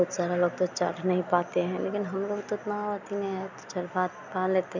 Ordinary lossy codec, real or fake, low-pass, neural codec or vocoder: none; real; 7.2 kHz; none